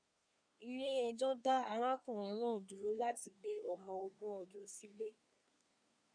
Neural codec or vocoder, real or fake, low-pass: codec, 24 kHz, 1 kbps, SNAC; fake; 9.9 kHz